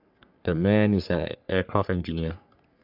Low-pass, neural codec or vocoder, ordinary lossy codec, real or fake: 5.4 kHz; codec, 44.1 kHz, 3.4 kbps, Pupu-Codec; none; fake